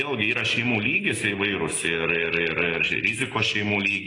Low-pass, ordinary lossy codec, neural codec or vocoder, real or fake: 10.8 kHz; AAC, 32 kbps; none; real